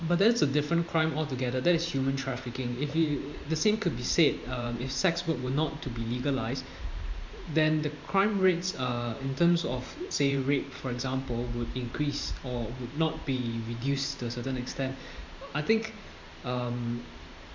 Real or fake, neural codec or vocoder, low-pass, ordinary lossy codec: fake; vocoder, 44.1 kHz, 128 mel bands every 512 samples, BigVGAN v2; 7.2 kHz; MP3, 64 kbps